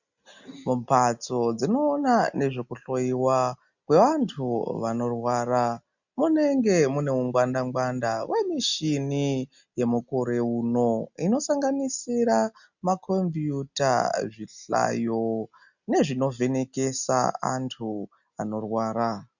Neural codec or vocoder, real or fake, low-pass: none; real; 7.2 kHz